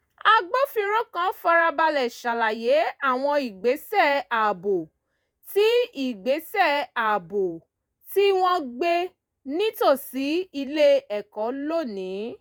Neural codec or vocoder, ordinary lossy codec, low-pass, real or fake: vocoder, 48 kHz, 128 mel bands, Vocos; none; none; fake